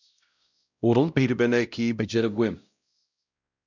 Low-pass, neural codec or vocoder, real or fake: 7.2 kHz; codec, 16 kHz, 0.5 kbps, X-Codec, WavLM features, trained on Multilingual LibriSpeech; fake